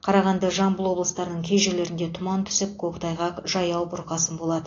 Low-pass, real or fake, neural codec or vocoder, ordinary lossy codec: 7.2 kHz; real; none; AAC, 32 kbps